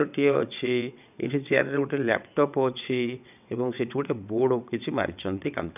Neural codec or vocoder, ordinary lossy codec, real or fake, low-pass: vocoder, 22.05 kHz, 80 mel bands, WaveNeXt; none; fake; 3.6 kHz